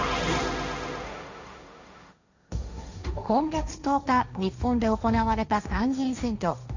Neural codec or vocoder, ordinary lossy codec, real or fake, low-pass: codec, 16 kHz, 1.1 kbps, Voila-Tokenizer; none; fake; 7.2 kHz